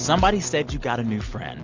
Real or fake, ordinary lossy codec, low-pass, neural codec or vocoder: real; MP3, 64 kbps; 7.2 kHz; none